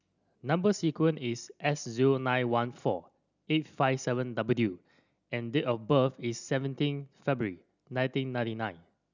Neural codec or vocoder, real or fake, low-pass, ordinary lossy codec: none; real; 7.2 kHz; none